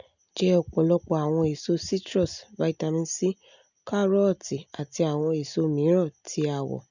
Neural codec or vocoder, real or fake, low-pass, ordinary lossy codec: none; real; 7.2 kHz; none